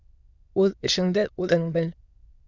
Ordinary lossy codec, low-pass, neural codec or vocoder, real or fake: Opus, 64 kbps; 7.2 kHz; autoencoder, 22.05 kHz, a latent of 192 numbers a frame, VITS, trained on many speakers; fake